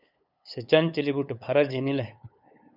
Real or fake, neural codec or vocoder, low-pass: fake; codec, 16 kHz, 8 kbps, FunCodec, trained on LibriTTS, 25 frames a second; 5.4 kHz